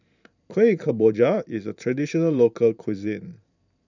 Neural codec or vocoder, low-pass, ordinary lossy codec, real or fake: vocoder, 44.1 kHz, 128 mel bands every 512 samples, BigVGAN v2; 7.2 kHz; none; fake